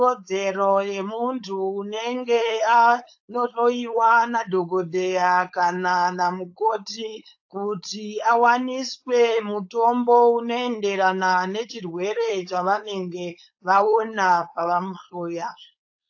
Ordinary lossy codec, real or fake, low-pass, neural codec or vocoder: AAC, 48 kbps; fake; 7.2 kHz; codec, 16 kHz, 4.8 kbps, FACodec